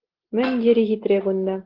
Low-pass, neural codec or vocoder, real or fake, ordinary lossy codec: 5.4 kHz; none; real; Opus, 16 kbps